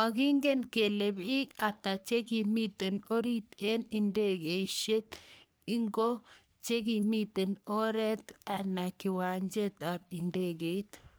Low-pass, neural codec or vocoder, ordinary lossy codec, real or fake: none; codec, 44.1 kHz, 3.4 kbps, Pupu-Codec; none; fake